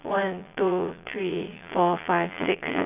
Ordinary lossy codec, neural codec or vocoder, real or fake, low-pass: none; vocoder, 22.05 kHz, 80 mel bands, Vocos; fake; 3.6 kHz